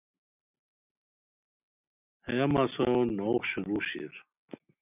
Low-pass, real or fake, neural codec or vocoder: 3.6 kHz; real; none